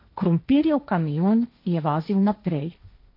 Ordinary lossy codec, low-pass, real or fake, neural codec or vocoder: MP3, 32 kbps; 5.4 kHz; fake; codec, 16 kHz, 1.1 kbps, Voila-Tokenizer